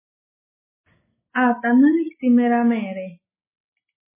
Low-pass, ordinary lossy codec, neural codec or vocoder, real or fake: 3.6 kHz; MP3, 16 kbps; none; real